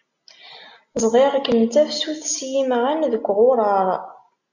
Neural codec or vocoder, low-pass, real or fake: none; 7.2 kHz; real